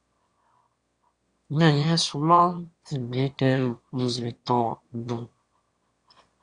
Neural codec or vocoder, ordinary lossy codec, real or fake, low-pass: autoencoder, 22.05 kHz, a latent of 192 numbers a frame, VITS, trained on one speaker; Opus, 64 kbps; fake; 9.9 kHz